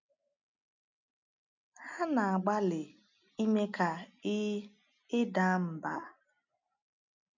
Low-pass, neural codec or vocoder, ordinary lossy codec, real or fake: 7.2 kHz; none; none; real